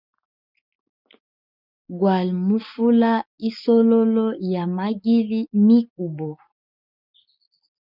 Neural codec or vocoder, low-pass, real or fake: none; 5.4 kHz; real